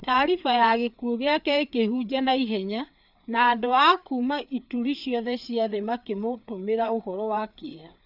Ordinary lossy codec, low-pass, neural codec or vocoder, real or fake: none; 5.4 kHz; codec, 16 kHz, 4 kbps, FreqCodec, larger model; fake